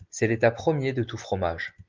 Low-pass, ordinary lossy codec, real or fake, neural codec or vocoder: 7.2 kHz; Opus, 32 kbps; real; none